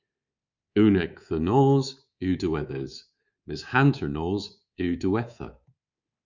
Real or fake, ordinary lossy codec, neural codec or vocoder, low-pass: fake; Opus, 64 kbps; codec, 24 kHz, 3.1 kbps, DualCodec; 7.2 kHz